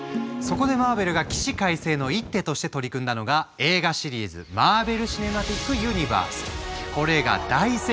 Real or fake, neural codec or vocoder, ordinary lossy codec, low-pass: real; none; none; none